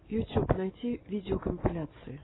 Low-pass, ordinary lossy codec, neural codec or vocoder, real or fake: 7.2 kHz; AAC, 16 kbps; none; real